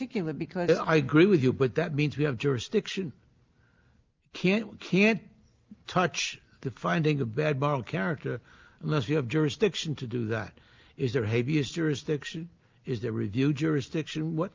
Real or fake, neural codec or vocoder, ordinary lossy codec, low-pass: real; none; Opus, 24 kbps; 7.2 kHz